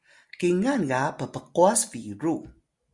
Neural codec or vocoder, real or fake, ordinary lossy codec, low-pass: none; real; Opus, 64 kbps; 10.8 kHz